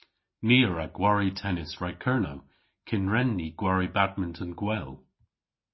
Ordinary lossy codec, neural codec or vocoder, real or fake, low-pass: MP3, 24 kbps; none; real; 7.2 kHz